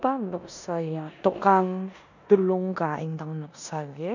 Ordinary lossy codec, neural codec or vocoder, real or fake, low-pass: none; codec, 16 kHz in and 24 kHz out, 0.9 kbps, LongCat-Audio-Codec, four codebook decoder; fake; 7.2 kHz